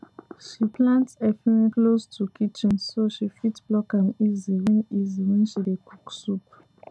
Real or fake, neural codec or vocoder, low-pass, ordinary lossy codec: real; none; none; none